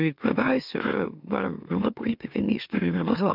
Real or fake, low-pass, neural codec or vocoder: fake; 5.4 kHz; autoencoder, 44.1 kHz, a latent of 192 numbers a frame, MeloTTS